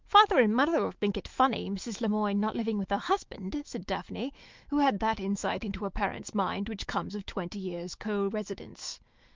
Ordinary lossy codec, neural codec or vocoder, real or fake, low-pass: Opus, 32 kbps; autoencoder, 48 kHz, 128 numbers a frame, DAC-VAE, trained on Japanese speech; fake; 7.2 kHz